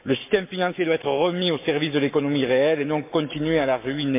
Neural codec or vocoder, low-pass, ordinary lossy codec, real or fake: codec, 44.1 kHz, 7.8 kbps, Pupu-Codec; 3.6 kHz; MP3, 32 kbps; fake